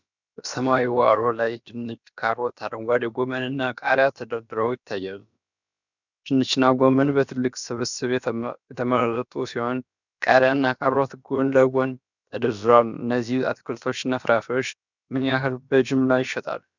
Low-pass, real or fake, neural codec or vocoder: 7.2 kHz; fake; codec, 16 kHz, about 1 kbps, DyCAST, with the encoder's durations